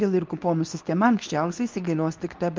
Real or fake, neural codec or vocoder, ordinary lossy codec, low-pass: fake; codec, 24 kHz, 0.9 kbps, WavTokenizer, medium speech release version 2; Opus, 24 kbps; 7.2 kHz